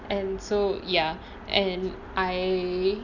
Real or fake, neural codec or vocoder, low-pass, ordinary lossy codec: real; none; 7.2 kHz; none